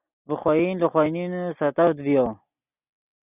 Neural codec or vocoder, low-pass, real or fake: none; 3.6 kHz; real